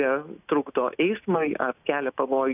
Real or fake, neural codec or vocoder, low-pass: real; none; 3.6 kHz